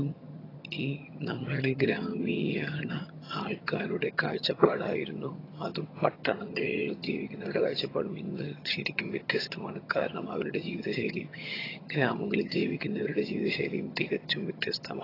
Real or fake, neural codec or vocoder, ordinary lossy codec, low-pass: fake; vocoder, 22.05 kHz, 80 mel bands, HiFi-GAN; AAC, 24 kbps; 5.4 kHz